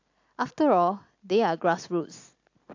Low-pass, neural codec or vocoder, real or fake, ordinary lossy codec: 7.2 kHz; none; real; AAC, 48 kbps